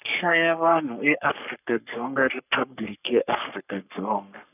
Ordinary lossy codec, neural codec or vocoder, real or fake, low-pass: none; codec, 44.1 kHz, 3.4 kbps, Pupu-Codec; fake; 3.6 kHz